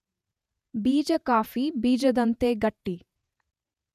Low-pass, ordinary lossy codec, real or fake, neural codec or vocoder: 14.4 kHz; none; fake; vocoder, 48 kHz, 128 mel bands, Vocos